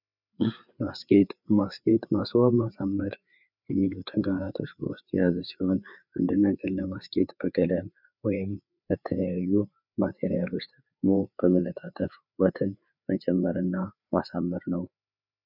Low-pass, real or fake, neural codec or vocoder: 5.4 kHz; fake; codec, 16 kHz, 4 kbps, FreqCodec, larger model